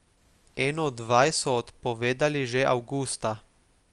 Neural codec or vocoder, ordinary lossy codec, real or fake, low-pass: none; Opus, 32 kbps; real; 10.8 kHz